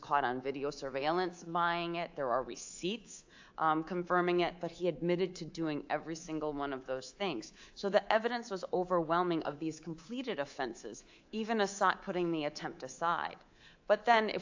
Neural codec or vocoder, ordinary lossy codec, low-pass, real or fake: codec, 24 kHz, 3.1 kbps, DualCodec; AAC, 48 kbps; 7.2 kHz; fake